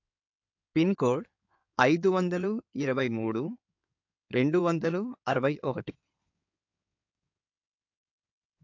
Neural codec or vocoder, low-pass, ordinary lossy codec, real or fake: codec, 16 kHz in and 24 kHz out, 2.2 kbps, FireRedTTS-2 codec; 7.2 kHz; AAC, 48 kbps; fake